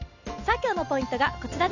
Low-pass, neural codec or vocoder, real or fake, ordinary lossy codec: 7.2 kHz; none; real; none